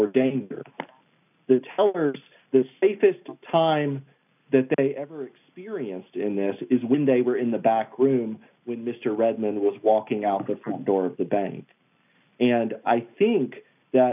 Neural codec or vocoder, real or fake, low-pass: none; real; 3.6 kHz